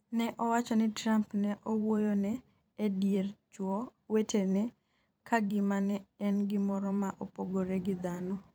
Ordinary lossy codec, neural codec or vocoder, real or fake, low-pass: none; vocoder, 44.1 kHz, 128 mel bands every 512 samples, BigVGAN v2; fake; none